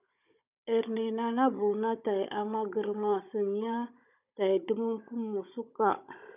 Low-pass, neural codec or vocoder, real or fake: 3.6 kHz; codec, 16 kHz, 16 kbps, FunCodec, trained on Chinese and English, 50 frames a second; fake